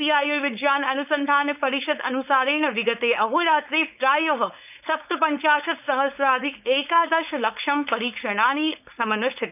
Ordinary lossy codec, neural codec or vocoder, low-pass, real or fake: none; codec, 16 kHz, 4.8 kbps, FACodec; 3.6 kHz; fake